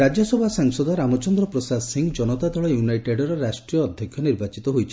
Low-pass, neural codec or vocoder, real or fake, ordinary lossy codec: none; none; real; none